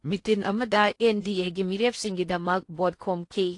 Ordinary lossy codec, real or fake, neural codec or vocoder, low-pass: AAC, 48 kbps; fake; codec, 16 kHz in and 24 kHz out, 0.8 kbps, FocalCodec, streaming, 65536 codes; 10.8 kHz